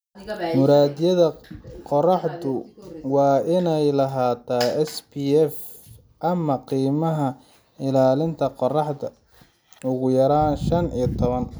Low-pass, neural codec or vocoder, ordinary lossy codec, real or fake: none; none; none; real